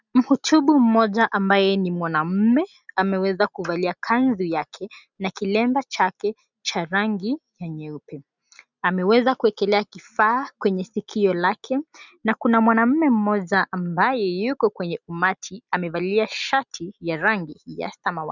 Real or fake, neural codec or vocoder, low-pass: real; none; 7.2 kHz